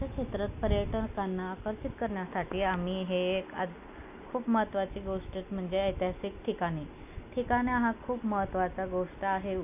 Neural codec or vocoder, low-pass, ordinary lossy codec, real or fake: none; 3.6 kHz; AAC, 32 kbps; real